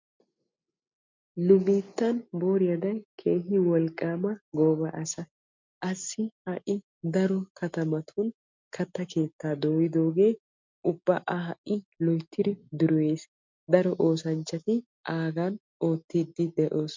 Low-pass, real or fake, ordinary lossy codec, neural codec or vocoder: 7.2 kHz; real; MP3, 64 kbps; none